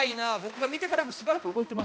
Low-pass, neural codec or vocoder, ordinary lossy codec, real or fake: none; codec, 16 kHz, 1 kbps, X-Codec, HuBERT features, trained on balanced general audio; none; fake